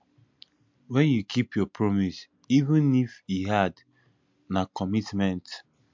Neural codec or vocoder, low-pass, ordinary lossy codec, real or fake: none; 7.2 kHz; MP3, 64 kbps; real